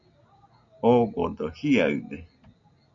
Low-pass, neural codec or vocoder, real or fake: 7.2 kHz; none; real